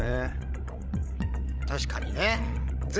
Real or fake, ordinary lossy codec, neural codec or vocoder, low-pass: fake; none; codec, 16 kHz, 16 kbps, FreqCodec, larger model; none